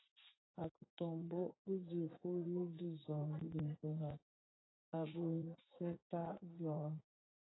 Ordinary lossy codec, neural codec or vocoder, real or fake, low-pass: AAC, 16 kbps; vocoder, 44.1 kHz, 80 mel bands, Vocos; fake; 7.2 kHz